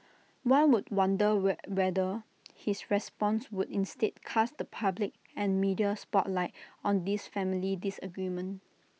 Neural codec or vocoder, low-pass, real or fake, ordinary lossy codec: none; none; real; none